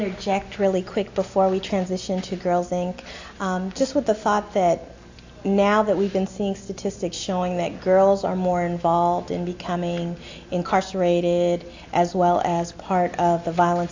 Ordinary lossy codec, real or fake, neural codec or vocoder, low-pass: AAC, 48 kbps; real; none; 7.2 kHz